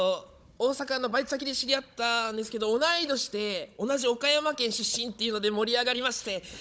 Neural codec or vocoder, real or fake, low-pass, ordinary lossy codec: codec, 16 kHz, 16 kbps, FunCodec, trained on Chinese and English, 50 frames a second; fake; none; none